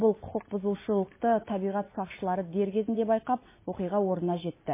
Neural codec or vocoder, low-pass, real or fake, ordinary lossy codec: none; 3.6 kHz; real; MP3, 16 kbps